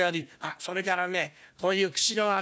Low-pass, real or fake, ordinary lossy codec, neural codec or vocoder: none; fake; none; codec, 16 kHz, 1 kbps, FunCodec, trained on Chinese and English, 50 frames a second